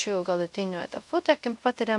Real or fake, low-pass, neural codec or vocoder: fake; 10.8 kHz; codec, 24 kHz, 0.5 kbps, DualCodec